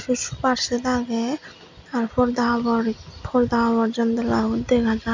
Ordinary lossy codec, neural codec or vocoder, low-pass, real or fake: MP3, 64 kbps; none; 7.2 kHz; real